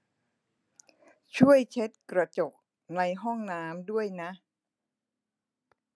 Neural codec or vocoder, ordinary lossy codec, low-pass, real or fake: none; none; none; real